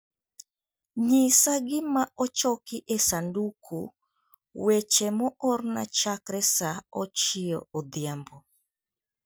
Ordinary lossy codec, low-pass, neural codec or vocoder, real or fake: none; none; none; real